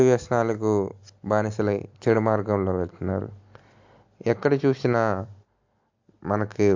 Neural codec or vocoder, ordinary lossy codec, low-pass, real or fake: none; MP3, 64 kbps; 7.2 kHz; real